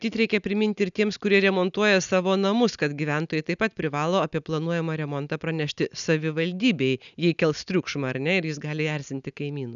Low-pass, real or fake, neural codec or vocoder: 7.2 kHz; real; none